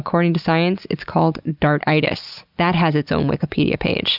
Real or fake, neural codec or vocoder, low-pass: real; none; 5.4 kHz